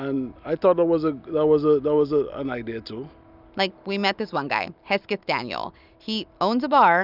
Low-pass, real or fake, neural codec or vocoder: 5.4 kHz; real; none